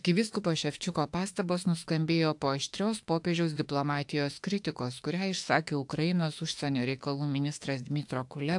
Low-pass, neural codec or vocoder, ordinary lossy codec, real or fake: 10.8 kHz; autoencoder, 48 kHz, 32 numbers a frame, DAC-VAE, trained on Japanese speech; AAC, 64 kbps; fake